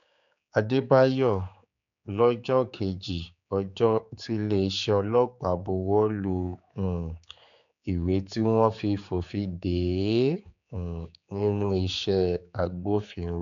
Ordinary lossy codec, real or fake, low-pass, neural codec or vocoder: none; fake; 7.2 kHz; codec, 16 kHz, 4 kbps, X-Codec, HuBERT features, trained on general audio